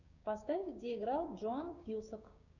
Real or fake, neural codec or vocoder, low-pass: fake; codec, 16 kHz, 6 kbps, DAC; 7.2 kHz